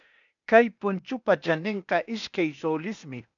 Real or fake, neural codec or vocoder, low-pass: fake; codec, 16 kHz, 0.8 kbps, ZipCodec; 7.2 kHz